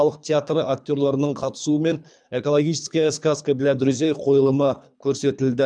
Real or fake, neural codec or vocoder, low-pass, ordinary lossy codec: fake; codec, 24 kHz, 3 kbps, HILCodec; 9.9 kHz; none